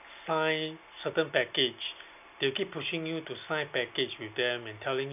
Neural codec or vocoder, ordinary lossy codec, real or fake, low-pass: none; none; real; 3.6 kHz